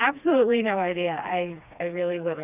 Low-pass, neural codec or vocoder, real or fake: 3.6 kHz; codec, 16 kHz, 2 kbps, FreqCodec, smaller model; fake